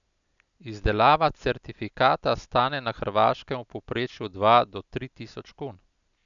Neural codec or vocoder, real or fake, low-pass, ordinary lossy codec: none; real; 7.2 kHz; none